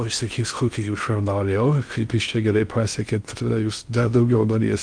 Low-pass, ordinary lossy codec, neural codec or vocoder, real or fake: 9.9 kHz; Opus, 32 kbps; codec, 16 kHz in and 24 kHz out, 0.6 kbps, FocalCodec, streaming, 2048 codes; fake